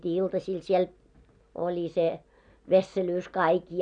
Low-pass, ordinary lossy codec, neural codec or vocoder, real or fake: 9.9 kHz; none; none; real